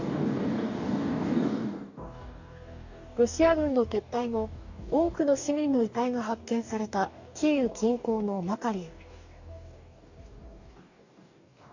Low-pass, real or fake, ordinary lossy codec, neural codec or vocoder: 7.2 kHz; fake; none; codec, 44.1 kHz, 2.6 kbps, DAC